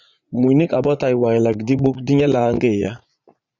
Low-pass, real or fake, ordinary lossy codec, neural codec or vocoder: 7.2 kHz; fake; Opus, 64 kbps; vocoder, 44.1 kHz, 80 mel bands, Vocos